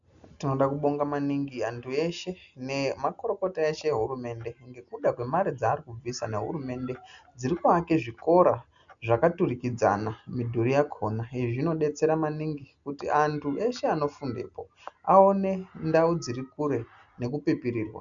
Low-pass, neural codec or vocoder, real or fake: 7.2 kHz; none; real